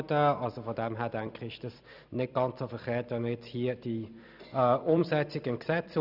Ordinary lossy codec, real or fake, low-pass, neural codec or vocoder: none; fake; 5.4 kHz; vocoder, 44.1 kHz, 128 mel bands every 256 samples, BigVGAN v2